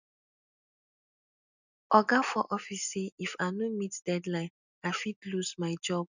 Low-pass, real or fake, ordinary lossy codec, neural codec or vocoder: 7.2 kHz; real; none; none